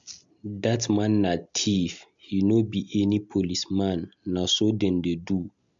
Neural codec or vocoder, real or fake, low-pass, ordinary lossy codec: none; real; 7.2 kHz; MP3, 64 kbps